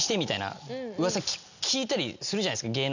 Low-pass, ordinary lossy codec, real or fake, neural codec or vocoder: 7.2 kHz; none; real; none